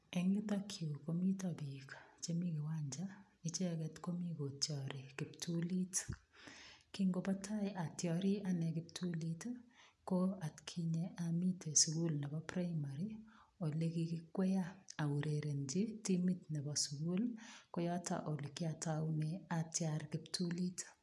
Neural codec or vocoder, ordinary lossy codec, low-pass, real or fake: none; none; none; real